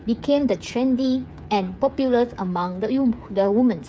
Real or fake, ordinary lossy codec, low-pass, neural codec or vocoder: fake; none; none; codec, 16 kHz, 8 kbps, FreqCodec, smaller model